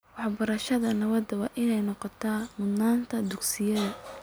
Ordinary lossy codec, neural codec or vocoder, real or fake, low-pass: none; none; real; none